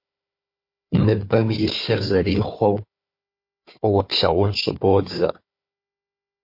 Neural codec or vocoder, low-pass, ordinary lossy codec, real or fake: codec, 16 kHz, 4 kbps, FunCodec, trained on Chinese and English, 50 frames a second; 5.4 kHz; MP3, 32 kbps; fake